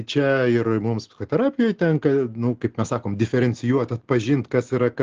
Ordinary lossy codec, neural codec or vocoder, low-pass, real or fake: Opus, 16 kbps; none; 7.2 kHz; real